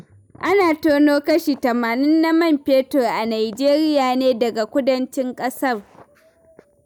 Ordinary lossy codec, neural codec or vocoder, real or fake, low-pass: none; none; real; none